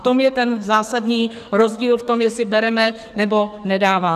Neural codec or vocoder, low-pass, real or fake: codec, 44.1 kHz, 2.6 kbps, SNAC; 14.4 kHz; fake